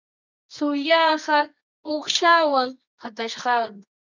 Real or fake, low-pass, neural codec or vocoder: fake; 7.2 kHz; codec, 24 kHz, 0.9 kbps, WavTokenizer, medium music audio release